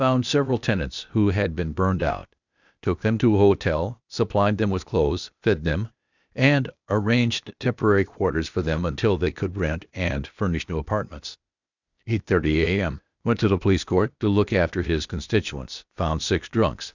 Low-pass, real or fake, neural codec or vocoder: 7.2 kHz; fake; codec, 16 kHz, 0.8 kbps, ZipCodec